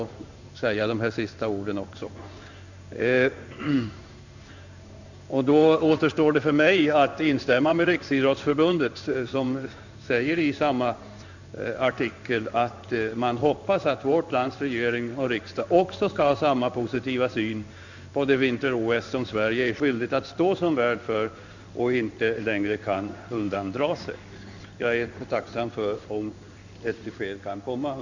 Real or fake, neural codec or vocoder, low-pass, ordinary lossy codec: fake; codec, 16 kHz in and 24 kHz out, 1 kbps, XY-Tokenizer; 7.2 kHz; none